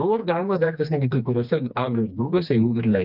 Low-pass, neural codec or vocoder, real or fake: 5.4 kHz; codec, 16 kHz, 2 kbps, FreqCodec, smaller model; fake